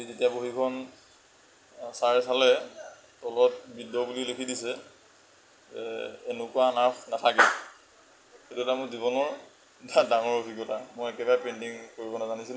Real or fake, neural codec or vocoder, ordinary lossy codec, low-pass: real; none; none; none